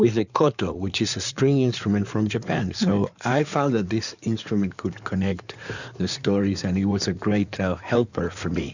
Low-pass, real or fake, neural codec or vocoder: 7.2 kHz; fake; codec, 16 kHz in and 24 kHz out, 2.2 kbps, FireRedTTS-2 codec